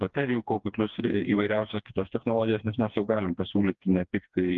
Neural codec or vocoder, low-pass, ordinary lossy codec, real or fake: codec, 16 kHz, 2 kbps, FreqCodec, smaller model; 7.2 kHz; Opus, 32 kbps; fake